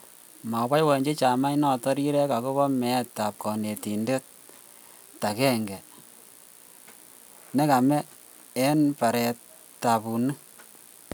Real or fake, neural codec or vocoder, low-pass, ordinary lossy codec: real; none; none; none